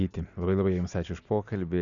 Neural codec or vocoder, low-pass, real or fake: none; 7.2 kHz; real